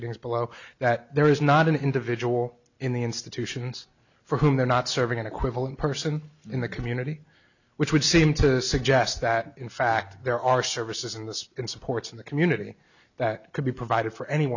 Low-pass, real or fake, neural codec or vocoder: 7.2 kHz; real; none